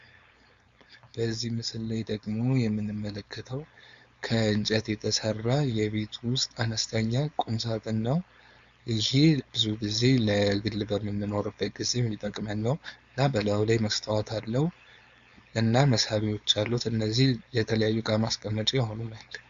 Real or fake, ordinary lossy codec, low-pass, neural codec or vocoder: fake; Opus, 64 kbps; 7.2 kHz; codec, 16 kHz, 4.8 kbps, FACodec